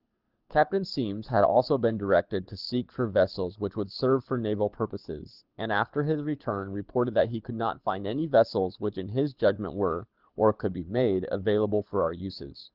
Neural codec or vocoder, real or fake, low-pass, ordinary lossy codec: codec, 24 kHz, 6 kbps, HILCodec; fake; 5.4 kHz; Opus, 24 kbps